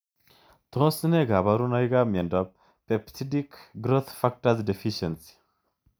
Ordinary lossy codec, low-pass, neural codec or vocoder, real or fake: none; none; none; real